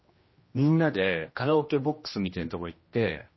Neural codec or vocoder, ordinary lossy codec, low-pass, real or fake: codec, 16 kHz, 1 kbps, X-Codec, HuBERT features, trained on general audio; MP3, 24 kbps; 7.2 kHz; fake